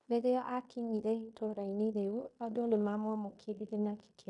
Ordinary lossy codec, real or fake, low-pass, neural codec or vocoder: MP3, 96 kbps; fake; 10.8 kHz; codec, 16 kHz in and 24 kHz out, 0.9 kbps, LongCat-Audio-Codec, fine tuned four codebook decoder